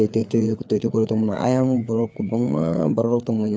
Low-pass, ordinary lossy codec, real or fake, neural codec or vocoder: none; none; fake; codec, 16 kHz, 4 kbps, FreqCodec, larger model